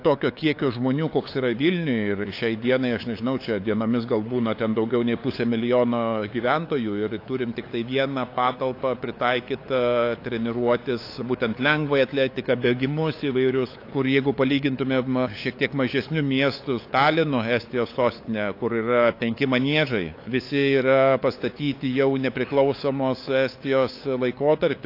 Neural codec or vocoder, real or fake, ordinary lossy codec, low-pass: codec, 16 kHz, 8 kbps, FunCodec, trained on LibriTTS, 25 frames a second; fake; AAC, 32 kbps; 5.4 kHz